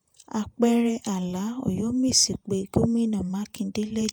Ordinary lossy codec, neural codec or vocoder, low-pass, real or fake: none; vocoder, 48 kHz, 128 mel bands, Vocos; none; fake